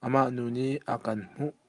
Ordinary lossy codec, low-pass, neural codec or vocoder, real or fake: Opus, 32 kbps; 10.8 kHz; none; real